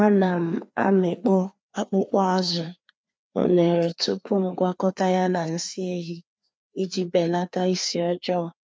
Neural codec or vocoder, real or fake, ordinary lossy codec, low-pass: codec, 16 kHz, 2 kbps, FreqCodec, larger model; fake; none; none